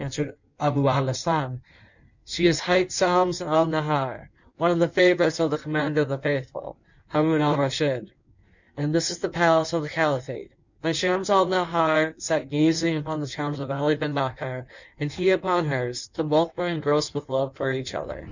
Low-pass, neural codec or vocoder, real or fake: 7.2 kHz; codec, 16 kHz in and 24 kHz out, 1.1 kbps, FireRedTTS-2 codec; fake